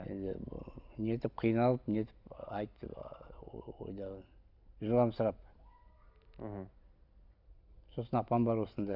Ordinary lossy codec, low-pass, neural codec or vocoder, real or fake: none; 5.4 kHz; none; real